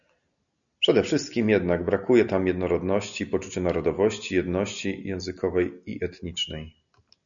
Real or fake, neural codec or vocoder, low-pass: real; none; 7.2 kHz